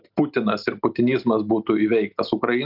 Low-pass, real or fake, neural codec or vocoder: 5.4 kHz; real; none